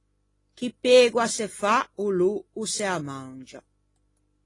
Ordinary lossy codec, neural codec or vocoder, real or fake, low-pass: AAC, 32 kbps; none; real; 10.8 kHz